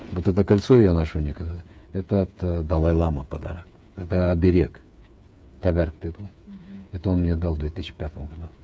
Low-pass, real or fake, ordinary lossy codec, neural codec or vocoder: none; fake; none; codec, 16 kHz, 8 kbps, FreqCodec, smaller model